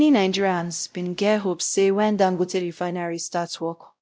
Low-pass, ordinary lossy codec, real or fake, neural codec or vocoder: none; none; fake; codec, 16 kHz, 0.5 kbps, X-Codec, WavLM features, trained on Multilingual LibriSpeech